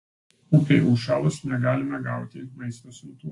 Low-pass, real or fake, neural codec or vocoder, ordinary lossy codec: 10.8 kHz; real; none; AAC, 48 kbps